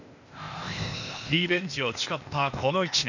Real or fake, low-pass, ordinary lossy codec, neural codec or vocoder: fake; 7.2 kHz; none; codec, 16 kHz, 0.8 kbps, ZipCodec